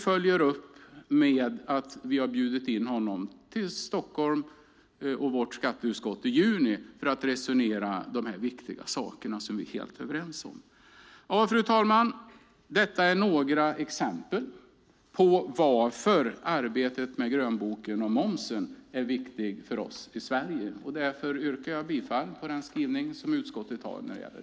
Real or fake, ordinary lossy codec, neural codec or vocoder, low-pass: real; none; none; none